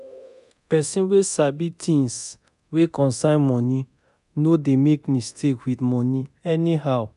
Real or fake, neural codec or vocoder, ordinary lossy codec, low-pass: fake; codec, 24 kHz, 0.9 kbps, DualCodec; none; 10.8 kHz